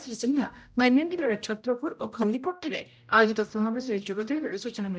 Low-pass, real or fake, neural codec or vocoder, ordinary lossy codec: none; fake; codec, 16 kHz, 0.5 kbps, X-Codec, HuBERT features, trained on general audio; none